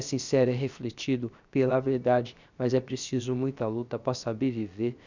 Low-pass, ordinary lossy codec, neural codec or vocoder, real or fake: 7.2 kHz; Opus, 64 kbps; codec, 16 kHz, 0.7 kbps, FocalCodec; fake